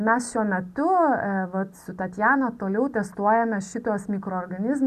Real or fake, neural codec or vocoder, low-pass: real; none; 14.4 kHz